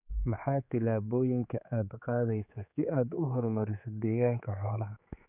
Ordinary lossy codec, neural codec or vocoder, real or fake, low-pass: none; codec, 16 kHz, 4 kbps, X-Codec, HuBERT features, trained on general audio; fake; 3.6 kHz